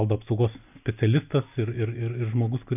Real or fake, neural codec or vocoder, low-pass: real; none; 3.6 kHz